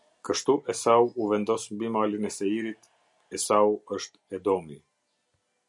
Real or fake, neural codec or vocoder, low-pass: real; none; 10.8 kHz